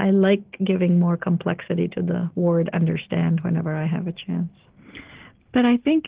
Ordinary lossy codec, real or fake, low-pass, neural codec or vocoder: Opus, 16 kbps; real; 3.6 kHz; none